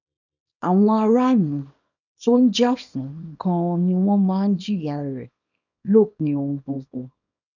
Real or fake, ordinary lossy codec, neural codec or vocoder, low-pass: fake; none; codec, 24 kHz, 0.9 kbps, WavTokenizer, small release; 7.2 kHz